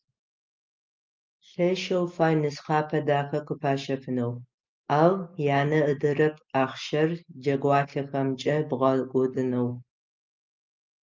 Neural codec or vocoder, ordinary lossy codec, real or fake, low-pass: none; Opus, 24 kbps; real; 7.2 kHz